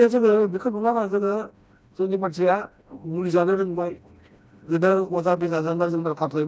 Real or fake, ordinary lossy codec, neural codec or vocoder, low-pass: fake; none; codec, 16 kHz, 1 kbps, FreqCodec, smaller model; none